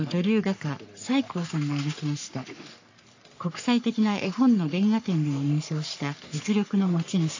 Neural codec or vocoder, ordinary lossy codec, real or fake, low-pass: codec, 44.1 kHz, 3.4 kbps, Pupu-Codec; AAC, 48 kbps; fake; 7.2 kHz